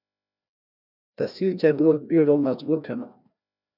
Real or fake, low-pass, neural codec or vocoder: fake; 5.4 kHz; codec, 16 kHz, 1 kbps, FreqCodec, larger model